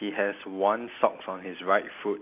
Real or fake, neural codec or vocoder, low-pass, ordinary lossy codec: real; none; 3.6 kHz; none